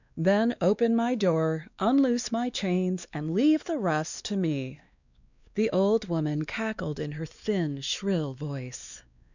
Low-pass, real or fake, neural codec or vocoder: 7.2 kHz; fake; codec, 16 kHz, 2 kbps, X-Codec, WavLM features, trained on Multilingual LibriSpeech